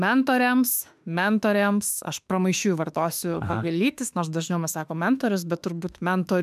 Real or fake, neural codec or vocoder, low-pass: fake; autoencoder, 48 kHz, 32 numbers a frame, DAC-VAE, trained on Japanese speech; 14.4 kHz